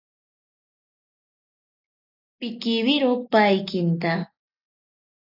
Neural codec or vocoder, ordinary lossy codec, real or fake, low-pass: none; AAC, 48 kbps; real; 5.4 kHz